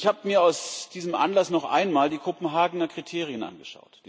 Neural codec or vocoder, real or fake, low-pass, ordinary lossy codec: none; real; none; none